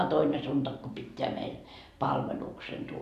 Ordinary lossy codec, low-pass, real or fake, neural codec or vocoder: AAC, 96 kbps; 14.4 kHz; fake; vocoder, 44.1 kHz, 128 mel bands every 512 samples, BigVGAN v2